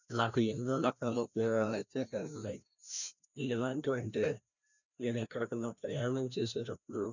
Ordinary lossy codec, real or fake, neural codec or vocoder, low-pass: none; fake; codec, 16 kHz, 1 kbps, FreqCodec, larger model; 7.2 kHz